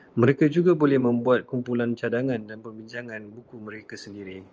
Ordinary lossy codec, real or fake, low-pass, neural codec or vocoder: Opus, 24 kbps; fake; 7.2 kHz; vocoder, 24 kHz, 100 mel bands, Vocos